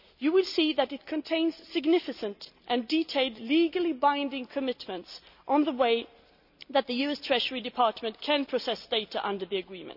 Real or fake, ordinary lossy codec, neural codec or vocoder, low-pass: real; none; none; 5.4 kHz